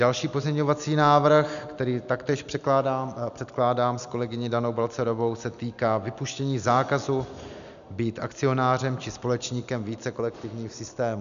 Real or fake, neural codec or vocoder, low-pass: real; none; 7.2 kHz